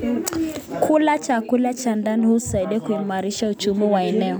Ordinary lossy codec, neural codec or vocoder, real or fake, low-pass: none; none; real; none